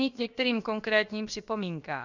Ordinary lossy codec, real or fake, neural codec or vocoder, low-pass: Opus, 64 kbps; fake; codec, 16 kHz, about 1 kbps, DyCAST, with the encoder's durations; 7.2 kHz